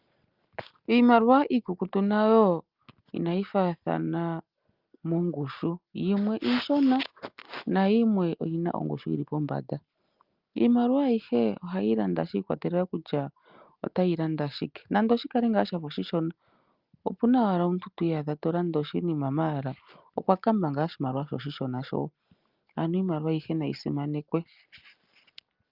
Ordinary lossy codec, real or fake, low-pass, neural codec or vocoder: Opus, 24 kbps; real; 5.4 kHz; none